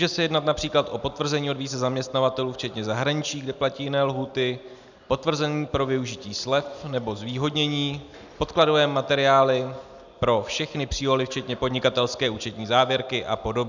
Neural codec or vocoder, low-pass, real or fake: none; 7.2 kHz; real